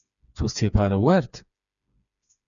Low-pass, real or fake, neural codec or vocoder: 7.2 kHz; fake; codec, 16 kHz, 4 kbps, FreqCodec, smaller model